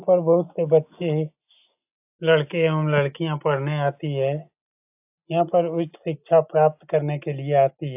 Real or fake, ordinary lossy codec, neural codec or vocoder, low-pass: real; AAC, 32 kbps; none; 3.6 kHz